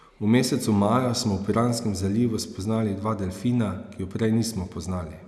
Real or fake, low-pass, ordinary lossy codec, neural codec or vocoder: real; none; none; none